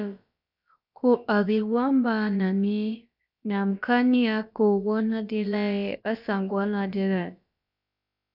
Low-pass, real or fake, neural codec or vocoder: 5.4 kHz; fake; codec, 16 kHz, about 1 kbps, DyCAST, with the encoder's durations